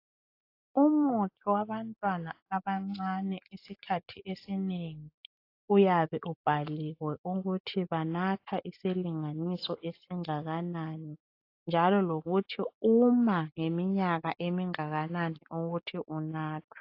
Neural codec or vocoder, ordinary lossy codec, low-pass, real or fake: none; AAC, 32 kbps; 5.4 kHz; real